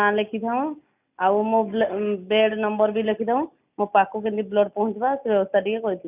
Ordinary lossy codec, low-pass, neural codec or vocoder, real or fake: none; 3.6 kHz; none; real